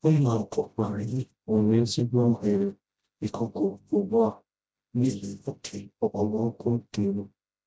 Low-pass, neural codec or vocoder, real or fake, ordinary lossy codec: none; codec, 16 kHz, 0.5 kbps, FreqCodec, smaller model; fake; none